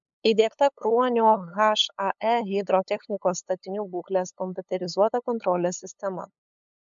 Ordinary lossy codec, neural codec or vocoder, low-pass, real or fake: MP3, 64 kbps; codec, 16 kHz, 8 kbps, FunCodec, trained on LibriTTS, 25 frames a second; 7.2 kHz; fake